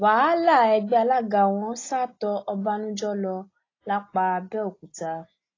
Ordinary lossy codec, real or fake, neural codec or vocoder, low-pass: AAC, 32 kbps; real; none; 7.2 kHz